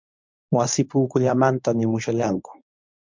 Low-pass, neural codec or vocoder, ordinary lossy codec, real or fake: 7.2 kHz; codec, 24 kHz, 0.9 kbps, WavTokenizer, medium speech release version 1; MP3, 64 kbps; fake